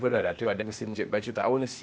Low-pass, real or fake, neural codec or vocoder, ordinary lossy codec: none; fake; codec, 16 kHz, 0.8 kbps, ZipCodec; none